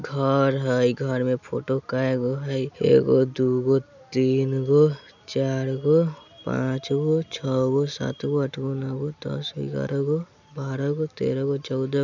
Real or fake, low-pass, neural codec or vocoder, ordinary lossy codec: real; 7.2 kHz; none; none